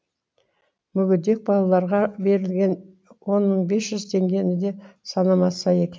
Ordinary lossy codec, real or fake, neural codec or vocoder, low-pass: none; real; none; none